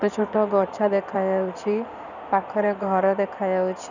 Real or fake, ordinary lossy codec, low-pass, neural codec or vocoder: fake; none; 7.2 kHz; codec, 16 kHz in and 24 kHz out, 2.2 kbps, FireRedTTS-2 codec